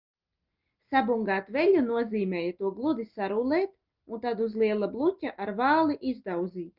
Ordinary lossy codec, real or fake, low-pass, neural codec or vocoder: Opus, 32 kbps; real; 5.4 kHz; none